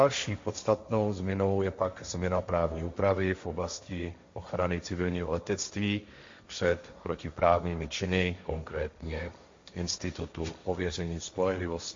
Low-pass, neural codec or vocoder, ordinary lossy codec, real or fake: 7.2 kHz; codec, 16 kHz, 1.1 kbps, Voila-Tokenizer; MP3, 48 kbps; fake